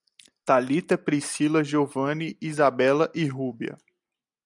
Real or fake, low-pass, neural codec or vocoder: real; 10.8 kHz; none